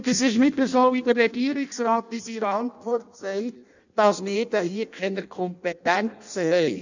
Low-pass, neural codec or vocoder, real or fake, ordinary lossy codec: 7.2 kHz; codec, 16 kHz in and 24 kHz out, 0.6 kbps, FireRedTTS-2 codec; fake; none